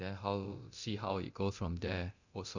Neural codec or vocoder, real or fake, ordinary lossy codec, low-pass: codec, 24 kHz, 0.9 kbps, DualCodec; fake; none; 7.2 kHz